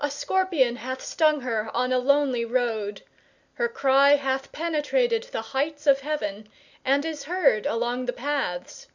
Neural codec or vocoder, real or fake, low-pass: none; real; 7.2 kHz